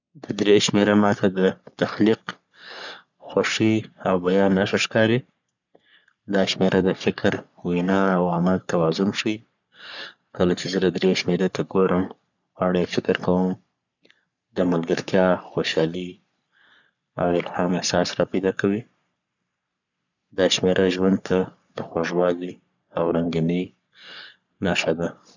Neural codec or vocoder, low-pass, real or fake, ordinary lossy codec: codec, 44.1 kHz, 3.4 kbps, Pupu-Codec; 7.2 kHz; fake; none